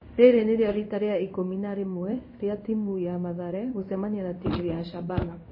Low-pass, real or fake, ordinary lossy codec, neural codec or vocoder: 5.4 kHz; fake; MP3, 24 kbps; codec, 16 kHz in and 24 kHz out, 1 kbps, XY-Tokenizer